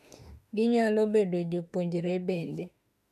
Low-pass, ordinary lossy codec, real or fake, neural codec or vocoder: 14.4 kHz; none; fake; autoencoder, 48 kHz, 32 numbers a frame, DAC-VAE, trained on Japanese speech